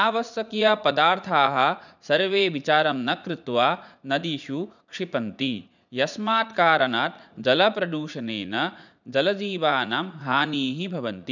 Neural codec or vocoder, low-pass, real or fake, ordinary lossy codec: vocoder, 44.1 kHz, 80 mel bands, Vocos; 7.2 kHz; fake; none